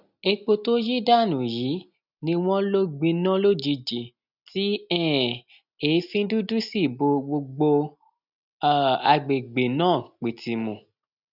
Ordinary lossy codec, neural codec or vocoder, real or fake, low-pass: none; none; real; 5.4 kHz